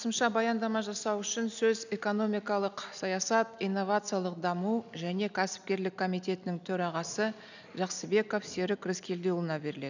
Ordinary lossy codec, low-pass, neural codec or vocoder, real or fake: none; 7.2 kHz; none; real